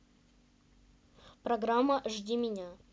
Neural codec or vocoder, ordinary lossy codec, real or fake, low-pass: none; none; real; none